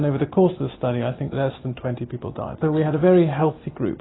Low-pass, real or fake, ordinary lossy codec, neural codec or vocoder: 7.2 kHz; real; AAC, 16 kbps; none